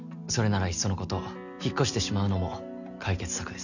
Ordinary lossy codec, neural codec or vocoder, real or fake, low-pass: none; none; real; 7.2 kHz